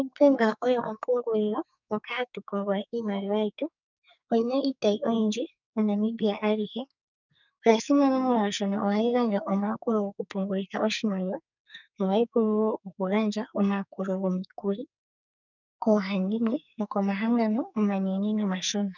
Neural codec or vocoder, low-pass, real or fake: codec, 44.1 kHz, 2.6 kbps, SNAC; 7.2 kHz; fake